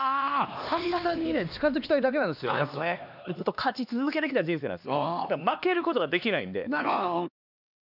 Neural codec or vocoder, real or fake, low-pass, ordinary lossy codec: codec, 16 kHz, 2 kbps, X-Codec, HuBERT features, trained on LibriSpeech; fake; 5.4 kHz; none